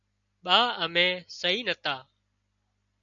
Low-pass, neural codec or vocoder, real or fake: 7.2 kHz; none; real